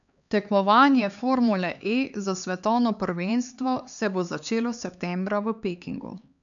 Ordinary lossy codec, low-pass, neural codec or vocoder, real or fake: none; 7.2 kHz; codec, 16 kHz, 4 kbps, X-Codec, HuBERT features, trained on LibriSpeech; fake